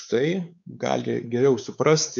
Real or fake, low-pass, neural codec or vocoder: fake; 7.2 kHz; codec, 16 kHz, 4 kbps, X-Codec, WavLM features, trained on Multilingual LibriSpeech